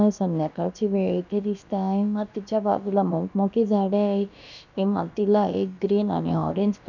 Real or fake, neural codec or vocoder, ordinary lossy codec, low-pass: fake; codec, 16 kHz, about 1 kbps, DyCAST, with the encoder's durations; none; 7.2 kHz